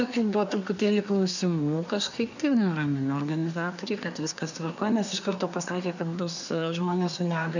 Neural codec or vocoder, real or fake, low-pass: codec, 24 kHz, 1 kbps, SNAC; fake; 7.2 kHz